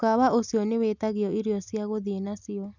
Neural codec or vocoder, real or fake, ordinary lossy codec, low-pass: none; real; none; 7.2 kHz